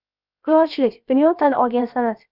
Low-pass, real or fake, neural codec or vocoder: 5.4 kHz; fake; codec, 16 kHz, 0.7 kbps, FocalCodec